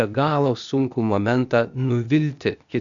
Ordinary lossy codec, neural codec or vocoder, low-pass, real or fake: MP3, 96 kbps; codec, 16 kHz, 0.8 kbps, ZipCodec; 7.2 kHz; fake